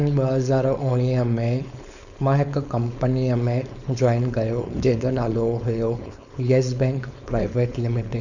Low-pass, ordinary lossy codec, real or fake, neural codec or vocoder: 7.2 kHz; none; fake; codec, 16 kHz, 4.8 kbps, FACodec